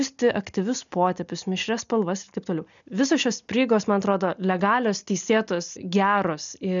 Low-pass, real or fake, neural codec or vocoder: 7.2 kHz; real; none